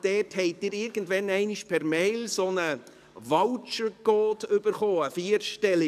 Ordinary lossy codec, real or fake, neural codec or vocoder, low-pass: none; fake; codec, 44.1 kHz, 7.8 kbps, DAC; 14.4 kHz